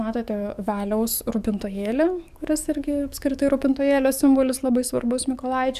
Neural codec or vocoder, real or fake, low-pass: codec, 44.1 kHz, 7.8 kbps, DAC; fake; 14.4 kHz